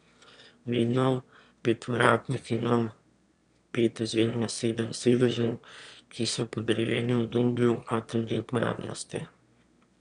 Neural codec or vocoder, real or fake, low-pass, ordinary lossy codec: autoencoder, 22.05 kHz, a latent of 192 numbers a frame, VITS, trained on one speaker; fake; 9.9 kHz; MP3, 96 kbps